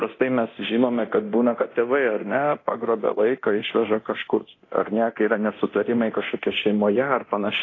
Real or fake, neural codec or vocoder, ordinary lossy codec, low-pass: fake; codec, 24 kHz, 0.9 kbps, DualCodec; AAC, 32 kbps; 7.2 kHz